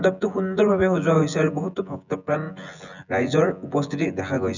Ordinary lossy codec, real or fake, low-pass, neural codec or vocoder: none; fake; 7.2 kHz; vocoder, 24 kHz, 100 mel bands, Vocos